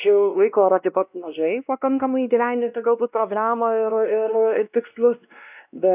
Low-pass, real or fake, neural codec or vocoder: 3.6 kHz; fake; codec, 16 kHz, 1 kbps, X-Codec, WavLM features, trained on Multilingual LibriSpeech